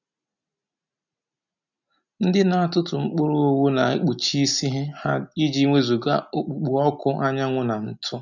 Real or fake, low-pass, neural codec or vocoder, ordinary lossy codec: real; 7.2 kHz; none; none